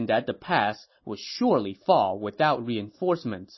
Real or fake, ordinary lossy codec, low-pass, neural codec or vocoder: real; MP3, 24 kbps; 7.2 kHz; none